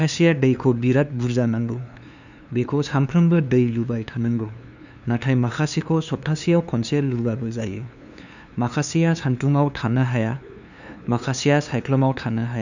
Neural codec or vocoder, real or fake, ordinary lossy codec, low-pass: codec, 16 kHz, 2 kbps, FunCodec, trained on LibriTTS, 25 frames a second; fake; none; 7.2 kHz